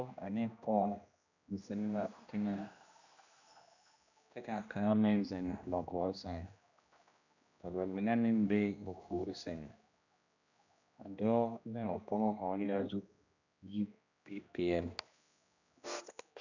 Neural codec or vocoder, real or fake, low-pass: codec, 16 kHz, 1 kbps, X-Codec, HuBERT features, trained on general audio; fake; 7.2 kHz